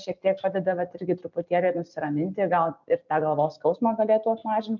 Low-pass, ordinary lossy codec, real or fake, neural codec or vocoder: 7.2 kHz; MP3, 64 kbps; real; none